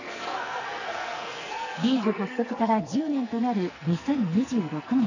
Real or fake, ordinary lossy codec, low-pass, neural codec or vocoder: fake; none; 7.2 kHz; codec, 44.1 kHz, 2.6 kbps, SNAC